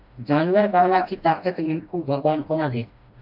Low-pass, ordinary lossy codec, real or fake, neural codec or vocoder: 5.4 kHz; AAC, 48 kbps; fake; codec, 16 kHz, 1 kbps, FreqCodec, smaller model